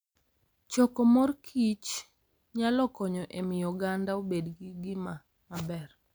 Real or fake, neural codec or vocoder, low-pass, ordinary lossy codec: real; none; none; none